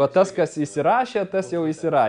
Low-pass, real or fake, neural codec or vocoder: 9.9 kHz; real; none